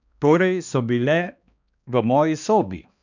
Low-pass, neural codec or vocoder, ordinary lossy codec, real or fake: 7.2 kHz; codec, 16 kHz, 2 kbps, X-Codec, HuBERT features, trained on balanced general audio; none; fake